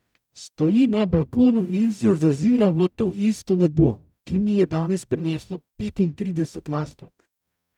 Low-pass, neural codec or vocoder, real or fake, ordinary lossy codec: 19.8 kHz; codec, 44.1 kHz, 0.9 kbps, DAC; fake; none